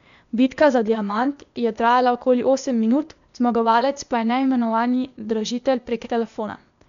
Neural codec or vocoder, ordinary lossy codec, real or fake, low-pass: codec, 16 kHz, 0.8 kbps, ZipCodec; none; fake; 7.2 kHz